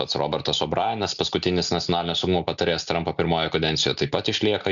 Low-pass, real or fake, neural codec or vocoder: 7.2 kHz; real; none